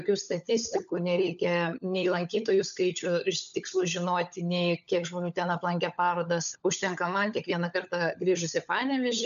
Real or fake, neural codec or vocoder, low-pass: fake; codec, 16 kHz, 16 kbps, FunCodec, trained on LibriTTS, 50 frames a second; 7.2 kHz